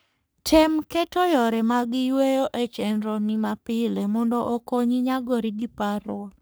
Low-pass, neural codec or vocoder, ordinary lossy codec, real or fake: none; codec, 44.1 kHz, 3.4 kbps, Pupu-Codec; none; fake